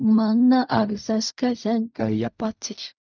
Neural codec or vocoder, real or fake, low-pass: codec, 16 kHz in and 24 kHz out, 0.4 kbps, LongCat-Audio-Codec, fine tuned four codebook decoder; fake; 7.2 kHz